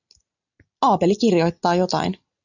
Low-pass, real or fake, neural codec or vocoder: 7.2 kHz; real; none